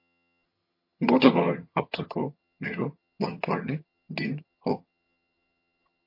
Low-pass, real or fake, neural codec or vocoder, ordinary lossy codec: 5.4 kHz; fake; vocoder, 22.05 kHz, 80 mel bands, HiFi-GAN; MP3, 32 kbps